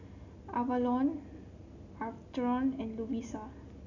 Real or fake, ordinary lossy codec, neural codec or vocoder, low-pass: real; none; none; 7.2 kHz